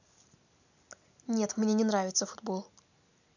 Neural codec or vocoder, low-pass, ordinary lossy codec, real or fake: none; 7.2 kHz; none; real